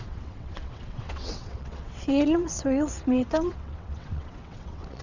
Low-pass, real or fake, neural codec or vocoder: 7.2 kHz; fake; vocoder, 22.05 kHz, 80 mel bands, WaveNeXt